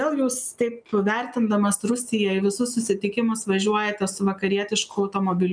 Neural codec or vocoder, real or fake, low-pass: none; real; 9.9 kHz